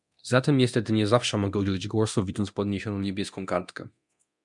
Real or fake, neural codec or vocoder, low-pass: fake; codec, 24 kHz, 0.9 kbps, DualCodec; 10.8 kHz